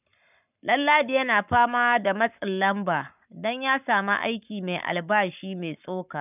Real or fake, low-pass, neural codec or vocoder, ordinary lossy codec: fake; 3.6 kHz; codec, 44.1 kHz, 7.8 kbps, Pupu-Codec; none